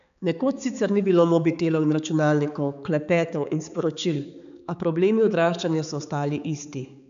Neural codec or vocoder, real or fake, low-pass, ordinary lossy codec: codec, 16 kHz, 4 kbps, X-Codec, HuBERT features, trained on balanced general audio; fake; 7.2 kHz; MP3, 96 kbps